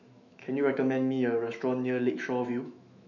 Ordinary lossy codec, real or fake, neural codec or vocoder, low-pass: none; fake; autoencoder, 48 kHz, 128 numbers a frame, DAC-VAE, trained on Japanese speech; 7.2 kHz